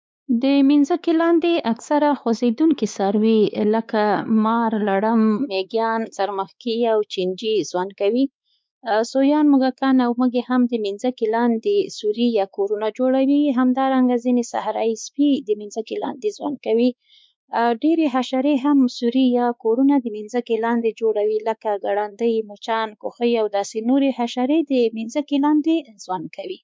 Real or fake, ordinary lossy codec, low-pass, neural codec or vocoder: fake; none; none; codec, 16 kHz, 4 kbps, X-Codec, WavLM features, trained on Multilingual LibriSpeech